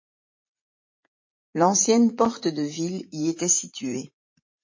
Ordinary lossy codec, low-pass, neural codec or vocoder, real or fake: MP3, 32 kbps; 7.2 kHz; vocoder, 44.1 kHz, 80 mel bands, Vocos; fake